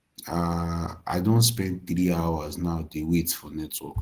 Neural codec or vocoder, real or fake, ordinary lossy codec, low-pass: none; real; Opus, 16 kbps; 14.4 kHz